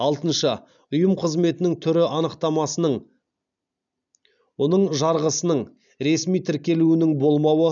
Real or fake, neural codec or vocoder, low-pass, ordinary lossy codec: real; none; 7.2 kHz; none